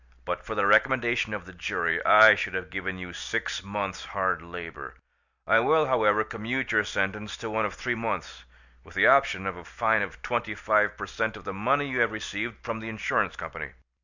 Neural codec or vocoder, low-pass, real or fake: none; 7.2 kHz; real